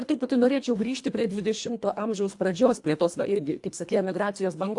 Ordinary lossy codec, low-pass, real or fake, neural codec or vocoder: AAC, 64 kbps; 10.8 kHz; fake; codec, 24 kHz, 1.5 kbps, HILCodec